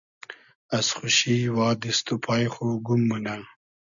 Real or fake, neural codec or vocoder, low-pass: real; none; 7.2 kHz